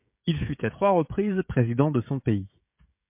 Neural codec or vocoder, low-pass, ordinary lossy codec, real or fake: codec, 16 kHz, 16 kbps, FreqCodec, smaller model; 3.6 kHz; MP3, 32 kbps; fake